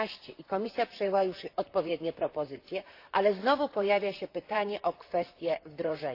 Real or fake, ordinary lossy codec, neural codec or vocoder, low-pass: fake; AAC, 32 kbps; vocoder, 44.1 kHz, 128 mel bands, Pupu-Vocoder; 5.4 kHz